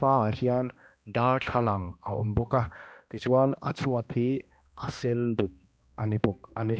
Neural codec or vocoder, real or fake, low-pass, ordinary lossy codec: codec, 16 kHz, 1 kbps, X-Codec, HuBERT features, trained on balanced general audio; fake; none; none